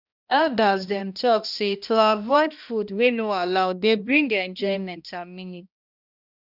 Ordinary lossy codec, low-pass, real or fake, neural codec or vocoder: none; 5.4 kHz; fake; codec, 16 kHz, 1 kbps, X-Codec, HuBERT features, trained on balanced general audio